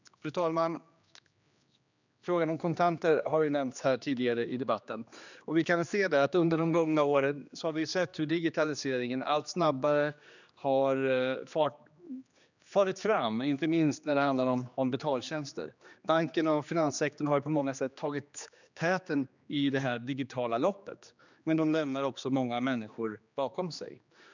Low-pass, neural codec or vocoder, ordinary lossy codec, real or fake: 7.2 kHz; codec, 16 kHz, 2 kbps, X-Codec, HuBERT features, trained on general audio; none; fake